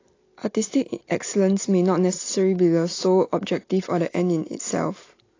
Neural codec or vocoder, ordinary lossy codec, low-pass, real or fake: none; AAC, 32 kbps; 7.2 kHz; real